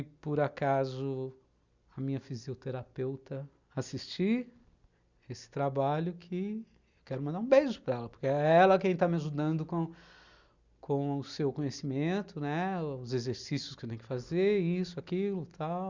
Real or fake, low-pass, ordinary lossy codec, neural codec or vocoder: real; 7.2 kHz; Opus, 64 kbps; none